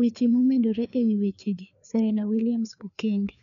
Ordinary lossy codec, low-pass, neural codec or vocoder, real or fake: none; 7.2 kHz; codec, 16 kHz, 2 kbps, FreqCodec, larger model; fake